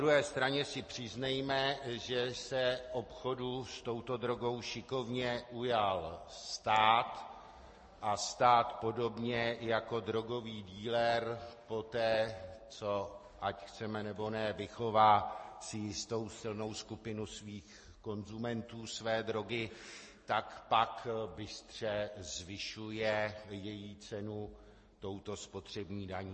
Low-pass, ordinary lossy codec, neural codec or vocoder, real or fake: 10.8 kHz; MP3, 32 kbps; none; real